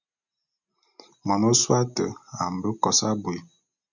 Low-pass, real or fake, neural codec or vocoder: 7.2 kHz; real; none